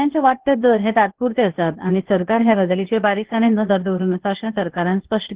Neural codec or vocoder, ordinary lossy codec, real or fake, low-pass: codec, 16 kHz, 0.8 kbps, ZipCodec; Opus, 16 kbps; fake; 3.6 kHz